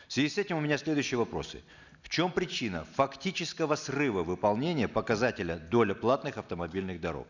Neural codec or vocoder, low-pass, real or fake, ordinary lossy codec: none; 7.2 kHz; real; none